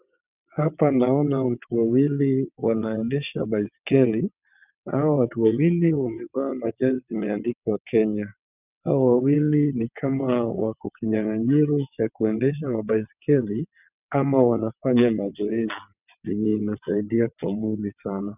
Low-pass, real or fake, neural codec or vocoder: 3.6 kHz; fake; vocoder, 22.05 kHz, 80 mel bands, WaveNeXt